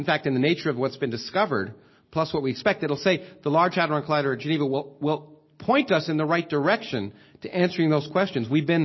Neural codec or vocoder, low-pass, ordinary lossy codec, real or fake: none; 7.2 kHz; MP3, 24 kbps; real